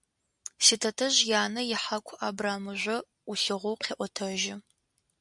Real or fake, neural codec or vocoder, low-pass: real; none; 10.8 kHz